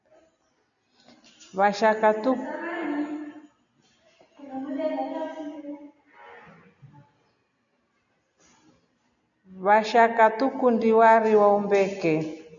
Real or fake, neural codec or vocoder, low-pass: real; none; 7.2 kHz